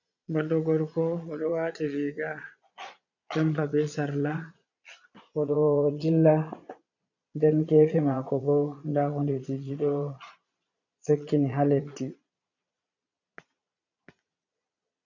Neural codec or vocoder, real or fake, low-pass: vocoder, 22.05 kHz, 80 mel bands, Vocos; fake; 7.2 kHz